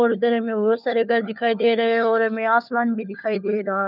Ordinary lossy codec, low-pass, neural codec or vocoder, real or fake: none; 5.4 kHz; codec, 16 kHz, 4 kbps, FunCodec, trained on LibriTTS, 50 frames a second; fake